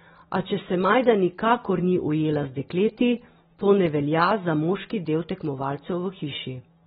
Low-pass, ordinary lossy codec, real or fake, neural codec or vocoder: 19.8 kHz; AAC, 16 kbps; real; none